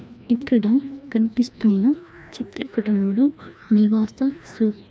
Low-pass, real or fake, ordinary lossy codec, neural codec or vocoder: none; fake; none; codec, 16 kHz, 1 kbps, FreqCodec, larger model